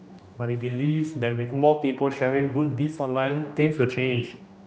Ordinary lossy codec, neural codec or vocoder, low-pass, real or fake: none; codec, 16 kHz, 1 kbps, X-Codec, HuBERT features, trained on general audio; none; fake